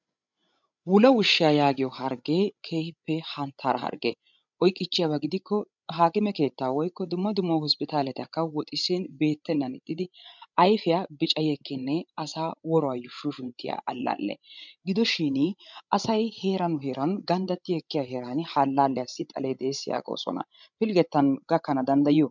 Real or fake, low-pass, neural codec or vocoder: fake; 7.2 kHz; codec, 16 kHz, 16 kbps, FreqCodec, larger model